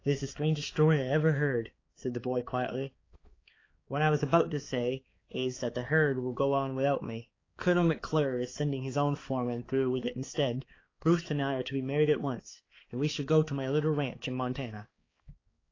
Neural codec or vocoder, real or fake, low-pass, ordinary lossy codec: codec, 16 kHz, 4 kbps, X-Codec, HuBERT features, trained on balanced general audio; fake; 7.2 kHz; AAC, 32 kbps